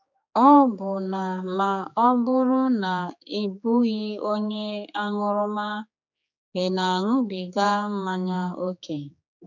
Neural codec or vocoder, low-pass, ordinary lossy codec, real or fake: codec, 16 kHz, 4 kbps, X-Codec, HuBERT features, trained on general audio; 7.2 kHz; none; fake